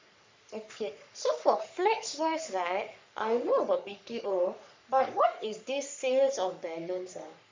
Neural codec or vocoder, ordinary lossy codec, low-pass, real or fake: codec, 44.1 kHz, 3.4 kbps, Pupu-Codec; MP3, 64 kbps; 7.2 kHz; fake